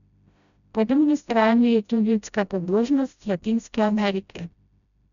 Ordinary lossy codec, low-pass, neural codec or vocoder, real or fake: none; 7.2 kHz; codec, 16 kHz, 0.5 kbps, FreqCodec, smaller model; fake